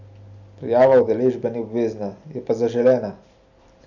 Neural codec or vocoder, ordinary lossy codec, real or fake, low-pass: none; none; real; 7.2 kHz